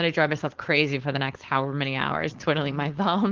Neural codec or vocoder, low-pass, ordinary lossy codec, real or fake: codec, 16 kHz, 4.8 kbps, FACodec; 7.2 kHz; Opus, 16 kbps; fake